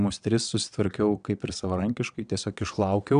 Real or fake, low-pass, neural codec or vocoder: fake; 9.9 kHz; vocoder, 22.05 kHz, 80 mel bands, WaveNeXt